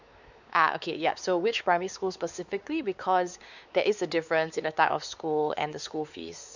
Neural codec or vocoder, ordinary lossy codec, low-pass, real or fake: codec, 16 kHz, 4 kbps, X-Codec, WavLM features, trained on Multilingual LibriSpeech; none; 7.2 kHz; fake